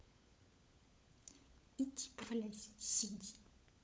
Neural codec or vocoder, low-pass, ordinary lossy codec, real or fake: codec, 16 kHz, 16 kbps, FunCodec, trained on LibriTTS, 50 frames a second; none; none; fake